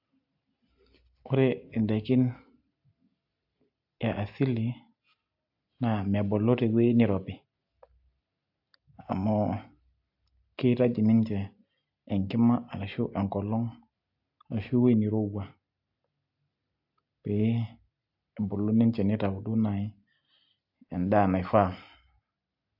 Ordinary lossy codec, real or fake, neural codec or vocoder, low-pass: AAC, 48 kbps; real; none; 5.4 kHz